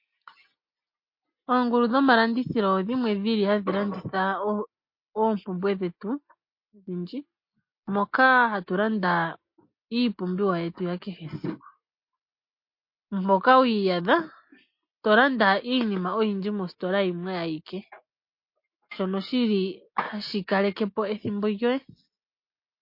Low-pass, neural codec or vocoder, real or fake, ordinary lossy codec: 5.4 kHz; none; real; MP3, 32 kbps